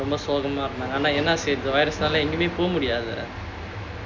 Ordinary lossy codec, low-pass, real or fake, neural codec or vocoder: MP3, 64 kbps; 7.2 kHz; real; none